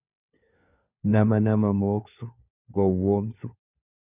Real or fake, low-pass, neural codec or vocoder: fake; 3.6 kHz; codec, 16 kHz, 4 kbps, FunCodec, trained on LibriTTS, 50 frames a second